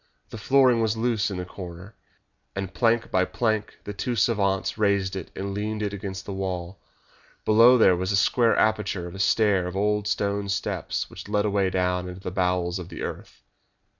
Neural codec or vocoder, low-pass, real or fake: none; 7.2 kHz; real